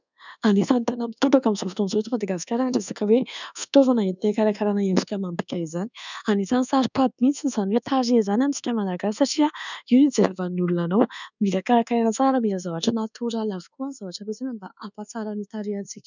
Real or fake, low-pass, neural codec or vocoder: fake; 7.2 kHz; codec, 24 kHz, 1.2 kbps, DualCodec